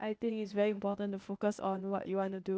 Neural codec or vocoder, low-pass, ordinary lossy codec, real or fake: codec, 16 kHz, 0.8 kbps, ZipCodec; none; none; fake